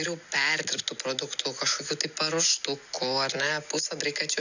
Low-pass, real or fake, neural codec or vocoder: 7.2 kHz; real; none